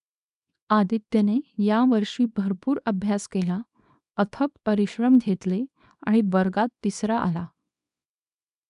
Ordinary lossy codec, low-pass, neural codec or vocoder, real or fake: none; 10.8 kHz; codec, 24 kHz, 0.9 kbps, WavTokenizer, small release; fake